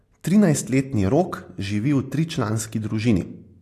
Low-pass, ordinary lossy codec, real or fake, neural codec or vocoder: 14.4 kHz; AAC, 64 kbps; real; none